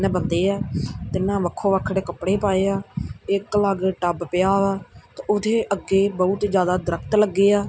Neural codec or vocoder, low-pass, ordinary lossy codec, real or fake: none; none; none; real